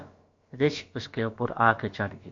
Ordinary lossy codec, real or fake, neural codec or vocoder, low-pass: MP3, 48 kbps; fake; codec, 16 kHz, about 1 kbps, DyCAST, with the encoder's durations; 7.2 kHz